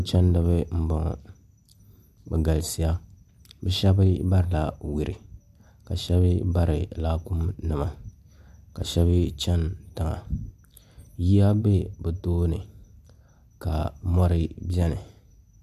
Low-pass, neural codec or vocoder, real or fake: 14.4 kHz; none; real